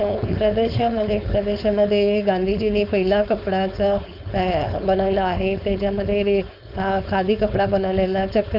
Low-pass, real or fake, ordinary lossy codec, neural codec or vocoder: 5.4 kHz; fake; none; codec, 16 kHz, 4.8 kbps, FACodec